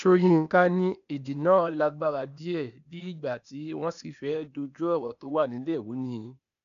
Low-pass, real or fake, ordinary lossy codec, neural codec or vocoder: 7.2 kHz; fake; none; codec, 16 kHz, 0.8 kbps, ZipCodec